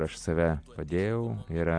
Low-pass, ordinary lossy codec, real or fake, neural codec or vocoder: 9.9 kHz; MP3, 96 kbps; real; none